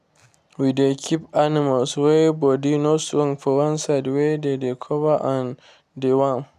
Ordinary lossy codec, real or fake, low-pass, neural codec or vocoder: none; real; 14.4 kHz; none